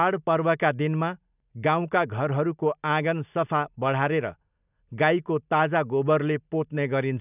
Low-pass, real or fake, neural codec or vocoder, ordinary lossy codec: 3.6 kHz; real; none; none